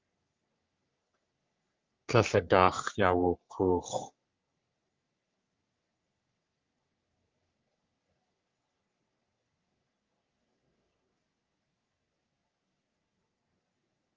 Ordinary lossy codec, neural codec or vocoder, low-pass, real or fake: Opus, 24 kbps; codec, 44.1 kHz, 3.4 kbps, Pupu-Codec; 7.2 kHz; fake